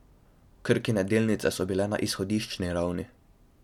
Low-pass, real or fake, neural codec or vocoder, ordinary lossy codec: 19.8 kHz; real; none; none